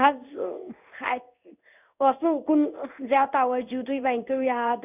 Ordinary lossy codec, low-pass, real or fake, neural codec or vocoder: none; 3.6 kHz; fake; codec, 16 kHz in and 24 kHz out, 1 kbps, XY-Tokenizer